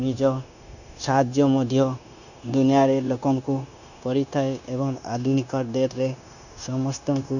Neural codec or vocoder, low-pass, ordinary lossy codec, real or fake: codec, 16 kHz, 0.9 kbps, LongCat-Audio-Codec; 7.2 kHz; none; fake